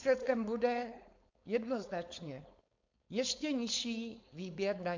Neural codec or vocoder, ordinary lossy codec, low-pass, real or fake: codec, 16 kHz, 4.8 kbps, FACodec; MP3, 48 kbps; 7.2 kHz; fake